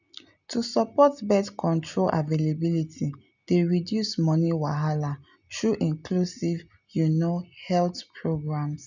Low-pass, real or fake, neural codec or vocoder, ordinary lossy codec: 7.2 kHz; real; none; none